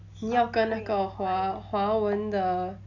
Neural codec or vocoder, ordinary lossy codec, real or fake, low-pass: none; none; real; 7.2 kHz